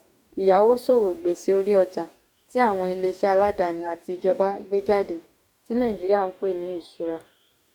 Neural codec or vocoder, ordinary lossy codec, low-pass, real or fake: codec, 44.1 kHz, 2.6 kbps, DAC; none; 19.8 kHz; fake